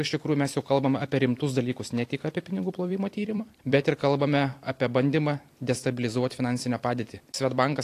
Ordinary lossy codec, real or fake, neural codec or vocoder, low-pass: AAC, 64 kbps; fake; vocoder, 44.1 kHz, 128 mel bands every 256 samples, BigVGAN v2; 14.4 kHz